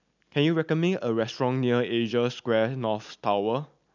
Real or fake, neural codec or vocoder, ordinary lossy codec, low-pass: real; none; none; 7.2 kHz